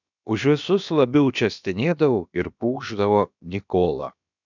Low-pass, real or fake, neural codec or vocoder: 7.2 kHz; fake; codec, 16 kHz, about 1 kbps, DyCAST, with the encoder's durations